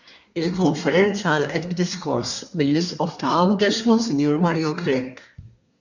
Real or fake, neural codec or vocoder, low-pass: fake; codec, 24 kHz, 1 kbps, SNAC; 7.2 kHz